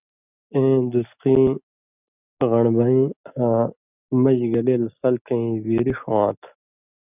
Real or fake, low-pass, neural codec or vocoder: real; 3.6 kHz; none